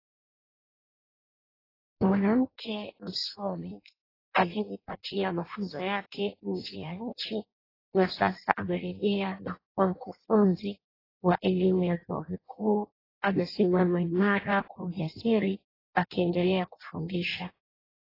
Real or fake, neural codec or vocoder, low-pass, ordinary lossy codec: fake; codec, 16 kHz in and 24 kHz out, 0.6 kbps, FireRedTTS-2 codec; 5.4 kHz; AAC, 24 kbps